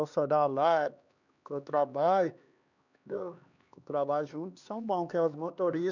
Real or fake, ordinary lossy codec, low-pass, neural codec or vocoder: fake; none; 7.2 kHz; codec, 16 kHz, 2 kbps, X-Codec, HuBERT features, trained on LibriSpeech